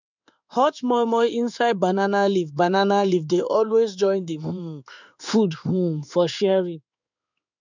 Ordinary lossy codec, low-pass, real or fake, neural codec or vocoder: none; 7.2 kHz; fake; codec, 16 kHz in and 24 kHz out, 1 kbps, XY-Tokenizer